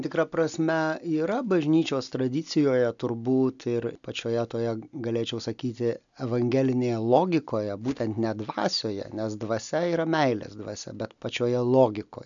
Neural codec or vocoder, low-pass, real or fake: none; 7.2 kHz; real